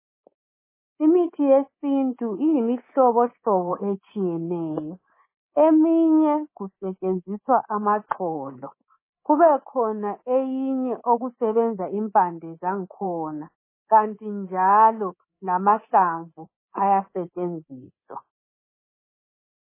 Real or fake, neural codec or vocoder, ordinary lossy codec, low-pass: fake; codec, 24 kHz, 3.1 kbps, DualCodec; MP3, 16 kbps; 3.6 kHz